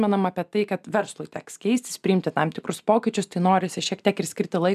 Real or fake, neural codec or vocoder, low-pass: real; none; 14.4 kHz